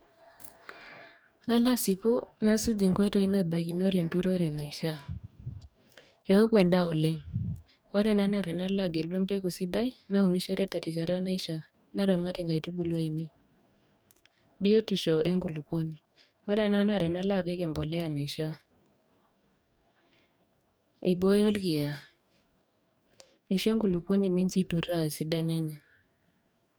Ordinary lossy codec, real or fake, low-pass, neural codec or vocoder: none; fake; none; codec, 44.1 kHz, 2.6 kbps, DAC